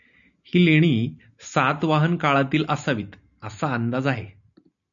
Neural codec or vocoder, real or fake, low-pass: none; real; 7.2 kHz